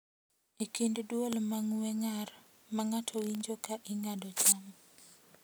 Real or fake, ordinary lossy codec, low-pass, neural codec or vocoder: real; none; none; none